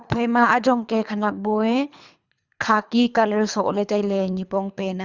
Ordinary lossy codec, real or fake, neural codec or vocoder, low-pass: Opus, 64 kbps; fake; codec, 24 kHz, 3 kbps, HILCodec; 7.2 kHz